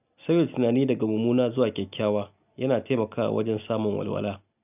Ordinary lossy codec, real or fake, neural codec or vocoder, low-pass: none; real; none; 3.6 kHz